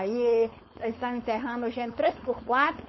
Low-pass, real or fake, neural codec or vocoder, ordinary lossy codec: 7.2 kHz; fake; codec, 16 kHz, 4.8 kbps, FACodec; MP3, 24 kbps